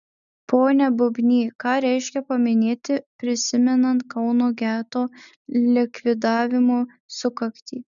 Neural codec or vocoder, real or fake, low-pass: none; real; 7.2 kHz